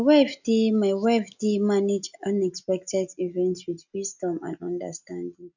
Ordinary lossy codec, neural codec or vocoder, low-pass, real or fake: none; none; 7.2 kHz; real